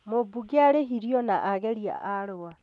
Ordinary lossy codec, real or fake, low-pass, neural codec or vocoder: none; real; none; none